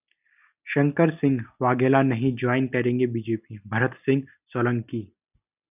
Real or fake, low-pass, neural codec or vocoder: real; 3.6 kHz; none